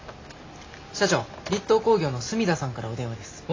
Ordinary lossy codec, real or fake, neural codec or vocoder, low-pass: AAC, 32 kbps; real; none; 7.2 kHz